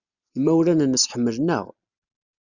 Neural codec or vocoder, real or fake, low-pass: none; real; 7.2 kHz